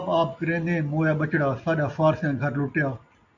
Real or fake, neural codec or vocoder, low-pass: real; none; 7.2 kHz